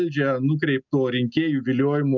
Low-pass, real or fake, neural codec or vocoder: 7.2 kHz; real; none